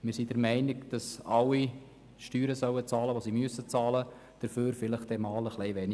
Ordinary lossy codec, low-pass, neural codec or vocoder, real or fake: none; none; none; real